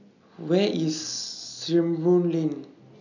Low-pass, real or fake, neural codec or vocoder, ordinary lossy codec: 7.2 kHz; real; none; none